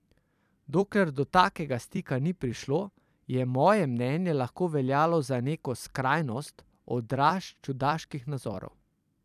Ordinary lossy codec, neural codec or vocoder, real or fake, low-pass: none; vocoder, 44.1 kHz, 128 mel bands every 256 samples, BigVGAN v2; fake; 14.4 kHz